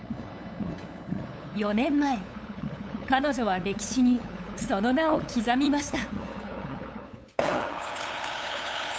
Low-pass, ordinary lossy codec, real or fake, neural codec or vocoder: none; none; fake; codec, 16 kHz, 8 kbps, FunCodec, trained on LibriTTS, 25 frames a second